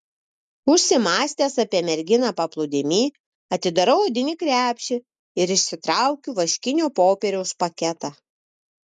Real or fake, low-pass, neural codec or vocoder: real; 10.8 kHz; none